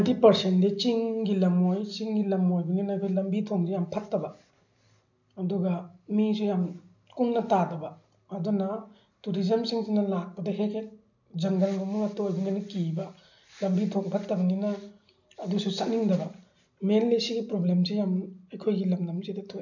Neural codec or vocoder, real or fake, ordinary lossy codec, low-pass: none; real; none; 7.2 kHz